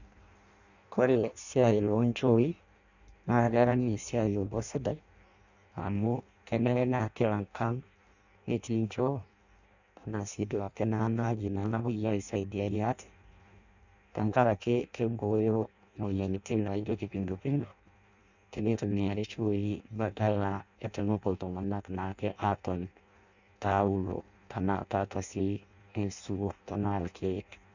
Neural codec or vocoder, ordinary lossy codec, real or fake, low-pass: codec, 16 kHz in and 24 kHz out, 0.6 kbps, FireRedTTS-2 codec; none; fake; 7.2 kHz